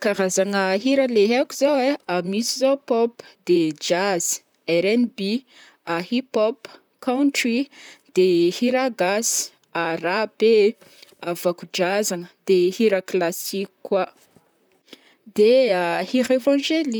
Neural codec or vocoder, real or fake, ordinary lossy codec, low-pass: vocoder, 44.1 kHz, 128 mel bands, Pupu-Vocoder; fake; none; none